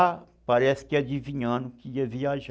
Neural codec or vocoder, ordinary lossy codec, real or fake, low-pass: none; none; real; none